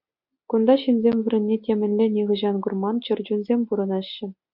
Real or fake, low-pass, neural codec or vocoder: real; 5.4 kHz; none